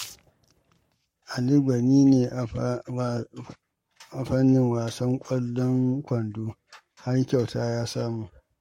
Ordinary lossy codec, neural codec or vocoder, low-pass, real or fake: MP3, 64 kbps; codec, 44.1 kHz, 7.8 kbps, Pupu-Codec; 19.8 kHz; fake